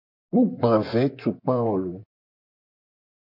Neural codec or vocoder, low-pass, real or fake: vocoder, 24 kHz, 100 mel bands, Vocos; 5.4 kHz; fake